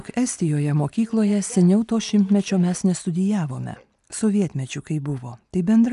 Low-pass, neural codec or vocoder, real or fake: 10.8 kHz; none; real